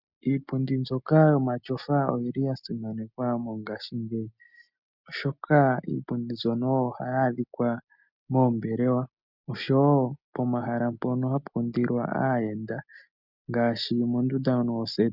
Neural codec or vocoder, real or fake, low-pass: none; real; 5.4 kHz